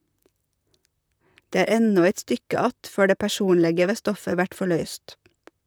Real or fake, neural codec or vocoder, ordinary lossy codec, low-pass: fake; vocoder, 44.1 kHz, 128 mel bands, Pupu-Vocoder; none; none